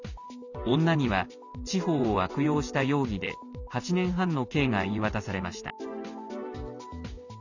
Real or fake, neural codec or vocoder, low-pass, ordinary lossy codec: real; none; 7.2 kHz; none